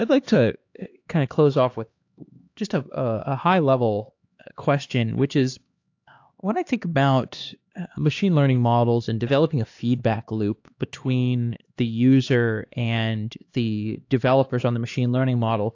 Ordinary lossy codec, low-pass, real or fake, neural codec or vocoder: AAC, 48 kbps; 7.2 kHz; fake; codec, 16 kHz, 2 kbps, X-Codec, HuBERT features, trained on LibriSpeech